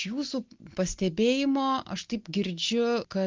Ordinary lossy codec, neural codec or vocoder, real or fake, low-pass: Opus, 32 kbps; none; real; 7.2 kHz